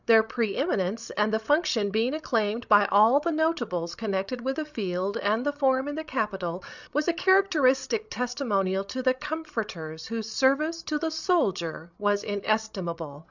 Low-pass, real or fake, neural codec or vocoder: 7.2 kHz; fake; codec, 16 kHz, 16 kbps, FreqCodec, larger model